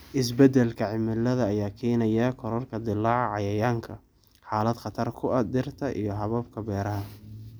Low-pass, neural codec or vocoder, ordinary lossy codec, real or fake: none; none; none; real